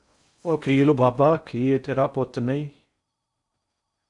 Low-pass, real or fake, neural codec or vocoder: 10.8 kHz; fake; codec, 16 kHz in and 24 kHz out, 0.6 kbps, FocalCodec, streaming, 4096 codes